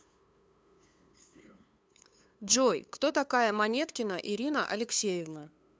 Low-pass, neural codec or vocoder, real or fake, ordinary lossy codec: none; codec, 16 kHz, 2 kbps, FunCodec, trained on LibriTTS, 25 frames a second; fake; none